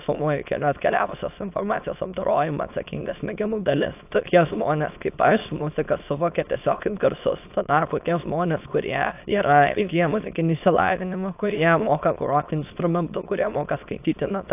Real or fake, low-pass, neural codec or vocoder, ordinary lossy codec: fake; 3.6 kHz; autoencoder, 22.05 kHz, a latent of 192 numbers a frame, VITS, trained on many speakers; AAC, 32 kbps